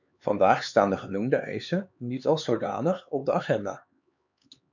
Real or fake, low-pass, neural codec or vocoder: fake; 7.2 kHz; codec, 16 kHz, 4 kbps, X-Codec, HuBERT features, trained on LibriSpeech